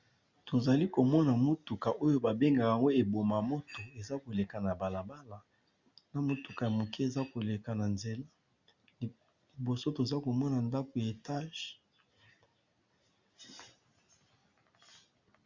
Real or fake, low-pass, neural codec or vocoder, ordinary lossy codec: real; 7.2 kHz; none; Opus, 64 kbps